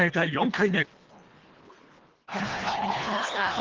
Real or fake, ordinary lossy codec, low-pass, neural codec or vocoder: fake; Opus, 16 kbps; 7.2 kHz; codec, 24 kHz, 1.5 kbps, HILCodec